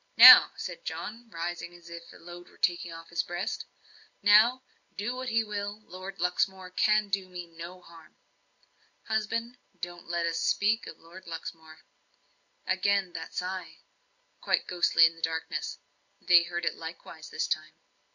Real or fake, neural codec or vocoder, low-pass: real; none; 7.2 kHz